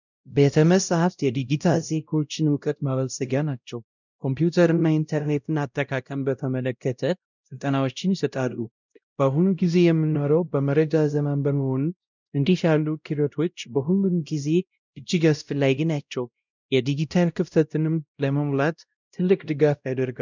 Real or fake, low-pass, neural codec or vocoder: fake; 7.2 kHz; codec, 16 kHz, 0.5 kbps, X-Codec, WavLM features, trained on Multilingual LibriSpeech